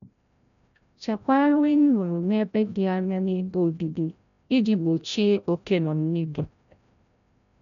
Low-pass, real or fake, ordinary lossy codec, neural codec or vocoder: 7.2 kHz; fake; none; codec, 16 kHz, 0.5 kbps, FreqCodec, larger model